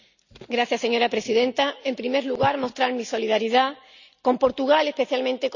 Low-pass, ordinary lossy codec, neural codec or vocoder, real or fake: 7.2 kHz; none; none; real